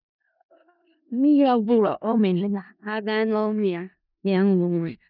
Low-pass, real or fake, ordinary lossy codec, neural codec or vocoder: 5.4 kHz; fake; none; codec, 16 kHz in and 24 kHz out, 0.4 kbps, LongCat-Audio-Codec, four codebook decoder